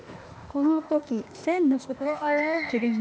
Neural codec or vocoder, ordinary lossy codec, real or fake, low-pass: codec, 16 kHz, 0.8 kbps, ZipCodec; none; fake; none